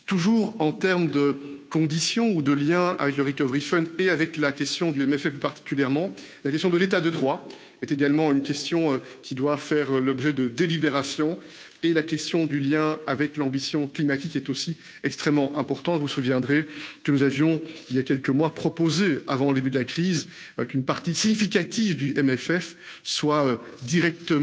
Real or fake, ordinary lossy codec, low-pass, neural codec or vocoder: fake; none; none; codec, 16 kHz, 2 kbps, FunCodec, trained on Chinese and English, 25 frames a second